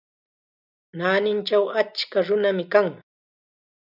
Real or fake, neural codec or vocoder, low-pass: real; none; 5.4 kHz